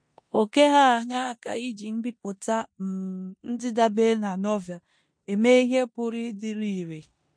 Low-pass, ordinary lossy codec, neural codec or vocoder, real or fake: 9.9 kHz; MP3, 48 kbps; codec, 16 kHz in and 24 kHz out, 0.9 kbps, LongCat-Audio-Codec, four codebook decoder; fake